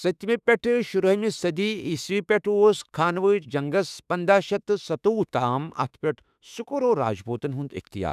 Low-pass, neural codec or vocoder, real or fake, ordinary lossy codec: 14.4 kHz; autoencoder, 48 kHz, 32 numbers a frame, DAC-VAE, trained on Japanese speech; fake; none